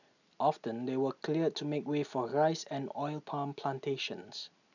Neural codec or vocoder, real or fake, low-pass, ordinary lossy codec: none; real; 7.2 kHz; none